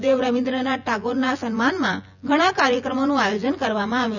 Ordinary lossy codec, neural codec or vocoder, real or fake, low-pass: none; vocoder, 24 kHz, 100 mel bands, Vocos; fake; 7.2 kHz